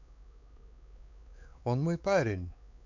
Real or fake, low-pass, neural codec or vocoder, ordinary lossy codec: fake; 7.2 kHz; codec, 16 kHz, 4 kbps, X-Codec, WavLM features, trained on Multilingual LibriSpeech; none